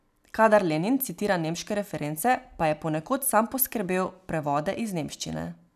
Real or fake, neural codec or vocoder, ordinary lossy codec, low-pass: real; none; none; 14.4 kHz